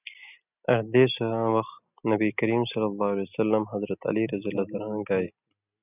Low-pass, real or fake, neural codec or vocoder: 3.6 kHz; real; none